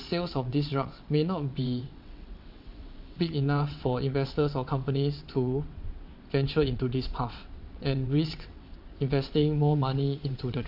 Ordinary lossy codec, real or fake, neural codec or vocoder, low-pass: AAC, 48 kbps; fake; vocoder, 22.05 kHz, 80 mel bands, WaveNeXt; 5.4 kHz